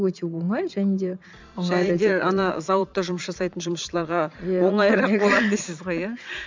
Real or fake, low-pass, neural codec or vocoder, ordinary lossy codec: fake; 7.2 kHz; vocoder, 22.05 kHz, 80 mel bands, Vocos; MP3, 64 kbps